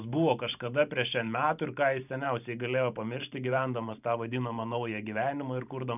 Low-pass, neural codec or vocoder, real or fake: 3.6 kHz; vocoder, 44.1 kHz, 128 mel bands every 512 samples, BigVGAN v2; fake